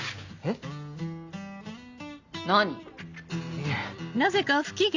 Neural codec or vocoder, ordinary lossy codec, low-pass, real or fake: none; Opus, 64 kbps; 7.2 kHz; real